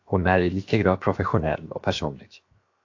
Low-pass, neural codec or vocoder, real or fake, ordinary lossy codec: 7.2 kHz; codec, 16 kHz, 0.7 kbps, FocalCodec; fake; AAC, 48 kbps